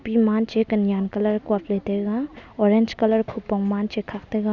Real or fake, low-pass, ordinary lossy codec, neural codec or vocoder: real; 7.2 kHz; none; none